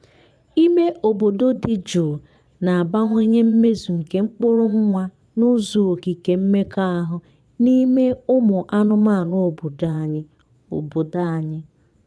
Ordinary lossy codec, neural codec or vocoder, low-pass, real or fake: none; vocoder, 22.05 kHz, 80 mel bands, Vocos; none; fake